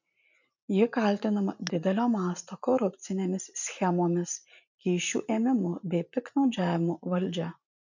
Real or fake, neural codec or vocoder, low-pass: fake; vocoder, 44.1 kHz, 80 mel bands, Vocos; 7.2 kHz